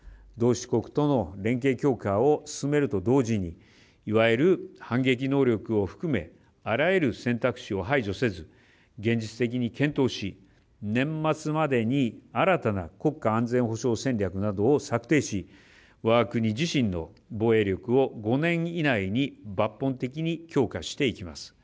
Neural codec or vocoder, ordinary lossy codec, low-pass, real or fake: none; none; none; real